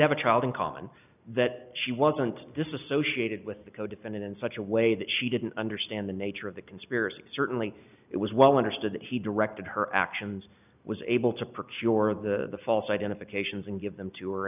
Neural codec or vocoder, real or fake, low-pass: none; real; 3.6 kHz